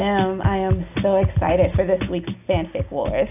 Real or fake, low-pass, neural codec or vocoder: real; 3.6 kHz; none